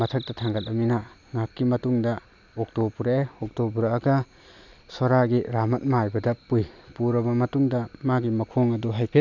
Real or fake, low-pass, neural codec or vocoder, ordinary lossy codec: real; 7.2 kHz; none; none